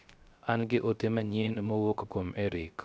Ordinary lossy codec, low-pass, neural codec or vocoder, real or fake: none; none; codec, 16 kHz, 0.3 kbps, FocalCodec; fake